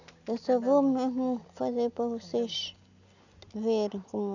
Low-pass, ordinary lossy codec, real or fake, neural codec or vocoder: 7.2 kHz; none; real; none